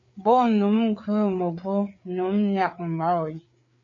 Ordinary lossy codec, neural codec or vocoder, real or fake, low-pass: MP3, 48 kbps; codec, 16 kHz, 4 kbps, FreqCodec, larger model; fake; 7.2 kHz